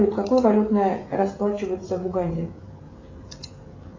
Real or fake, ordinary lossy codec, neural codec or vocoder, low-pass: fake; AAC, 32 kbps; codec, 16 kHz, 16 kbps, FreqCodec, smaller model; 7.2 kHz